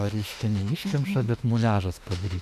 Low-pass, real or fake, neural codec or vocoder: 14.4 kHz; fake; autoencoder, 48 kHz, 32 numbers a frame, DAC-VAE, trained on Japanese speech